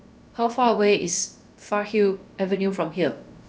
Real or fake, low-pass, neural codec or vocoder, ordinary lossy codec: fake; none; codec, 16 kHz, about 1 kbps, DyCAST, with the encoder's durations; none